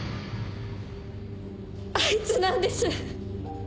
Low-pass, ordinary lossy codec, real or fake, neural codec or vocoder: none; none; real; none